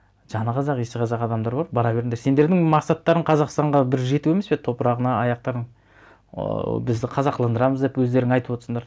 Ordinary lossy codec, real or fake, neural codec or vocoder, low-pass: none; real; none; none